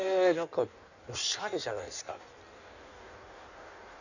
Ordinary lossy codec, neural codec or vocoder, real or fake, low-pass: none; codec, 16 kHz in and 24 kHz out, 1.1 kbps, FireRedTTS-2 codec; fake; 7.2 kHz